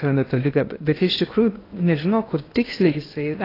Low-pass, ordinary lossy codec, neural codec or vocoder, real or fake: 5.4 kHz; AAC, 24 kbps; codec, 16 kHz in and 24 kHz out, 0.8 kbps, FocalCodec, streaming, 65536 codes; fake